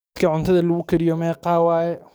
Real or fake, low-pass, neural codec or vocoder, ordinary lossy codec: fake; none; codec, 44.1 kHz, 7.8 kbps, DAC; none